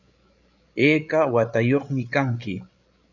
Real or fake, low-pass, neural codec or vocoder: fake; 7.2 kHz; codec, 16 kHz, 8 kbps, FreqCodec, larger model